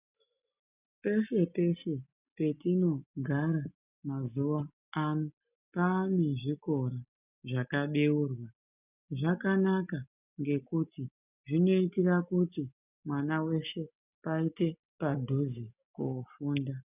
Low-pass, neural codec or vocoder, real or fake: 3.6 kHz; none; real